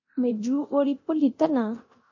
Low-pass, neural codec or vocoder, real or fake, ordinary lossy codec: 7.2 kHz; codec, 24 kHz, 0.9 kbps, DualCodec; fake; MP3, 32 kbps